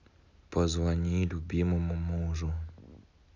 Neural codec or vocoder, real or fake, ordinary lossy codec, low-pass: none; real; none; 7.2 kHz